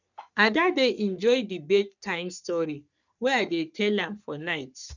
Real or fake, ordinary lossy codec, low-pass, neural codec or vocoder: fake; none; 7.2 kHz; codec, 44.1 kHz, 3.4 kbps, Pupu-Codec